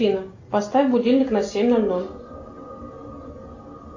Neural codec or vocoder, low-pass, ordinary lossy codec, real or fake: none; 7.2 kHz; AAC, 48 kbps; real